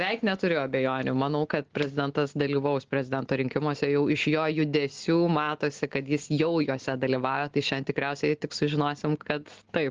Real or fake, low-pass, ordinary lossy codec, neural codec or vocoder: real; 7.2 kHz; Opus, 16 kbps; none